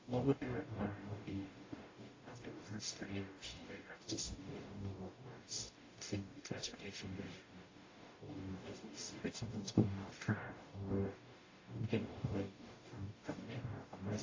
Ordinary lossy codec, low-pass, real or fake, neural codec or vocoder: AAC, 32 kbps; 7.2 kHz; fake; codec, 44.1 kHz, 0.9 kbps, DAC